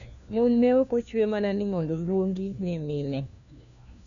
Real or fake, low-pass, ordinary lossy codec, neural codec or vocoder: fake; 7.2 kHz; none; codec, 16 kHz, 1 kbps, FunCodec, trained on LibriTTS, 50 frames a second